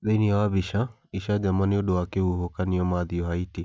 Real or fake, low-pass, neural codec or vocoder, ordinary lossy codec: real; none; none; none